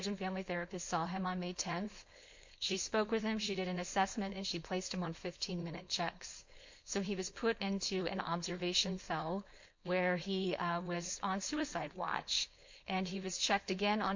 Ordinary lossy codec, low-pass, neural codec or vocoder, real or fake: MP3, 48 kbps; 7.2 kHz; codec, 16 kHz, 4.8 kbps, FACodec; fake